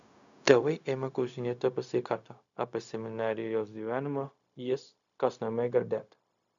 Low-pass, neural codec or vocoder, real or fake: 7.2 kHz; codec, 16 kHz, 0.4 kbps, LongCat-Audio-Codec; fake